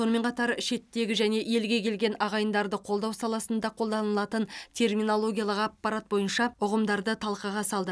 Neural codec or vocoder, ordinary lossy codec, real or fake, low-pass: none; none; real; none